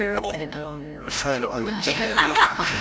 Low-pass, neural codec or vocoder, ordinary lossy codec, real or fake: none; codec, 16 kHz, 0.5 kbps, FreqCodec, larger model; none; fake